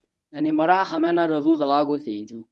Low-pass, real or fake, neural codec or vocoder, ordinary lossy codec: none; fake; codec, 24 kHz, 0.9 kbps, WavTokenizer, medium speech release version 1; none